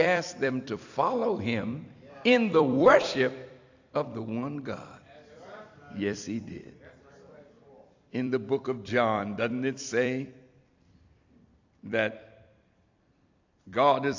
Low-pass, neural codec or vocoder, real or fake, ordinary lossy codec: 7.2 kHz; vocoder, 44.1 kHz, 128 mel bands every 256 samples, BigVGAN v2; fake; AAC, 48 kbps